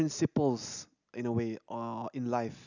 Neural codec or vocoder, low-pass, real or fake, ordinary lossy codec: none; 7.2 kHz; real; none